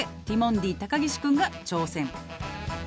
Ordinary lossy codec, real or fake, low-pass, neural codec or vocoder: none; real; none; none